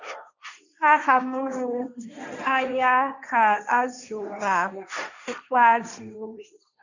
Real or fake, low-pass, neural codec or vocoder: fake; 7.2 kHz; codec, 16 kHz, 1.1 kbps, Voila-Tokenizer